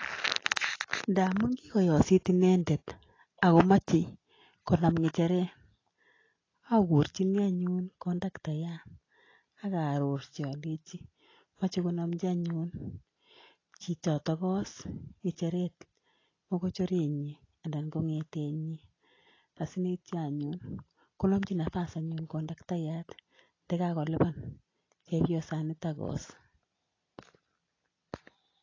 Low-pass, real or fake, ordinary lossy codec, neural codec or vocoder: 7.2 kHz; real; AAC, 32 kbps; none